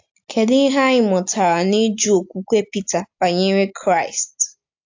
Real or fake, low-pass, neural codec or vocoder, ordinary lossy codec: real; 7.2 kHz; none; AAC, 48 kbps